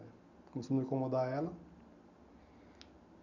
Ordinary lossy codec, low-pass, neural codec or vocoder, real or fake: none; 7.2 kHz; none; real